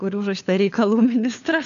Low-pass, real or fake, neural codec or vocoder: 7.2 kHz; fake; codec, 16 kHz, 4 kbps, FunCodec, trained on LibriTTS, 50 frames a second